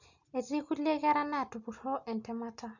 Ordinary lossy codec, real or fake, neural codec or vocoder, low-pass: none; real; none; 7.2 kHz